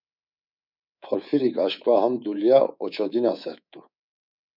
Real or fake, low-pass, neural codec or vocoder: fake; 5.4 kHz; codec, 24 kHz, 3.1 kbps, DualCodec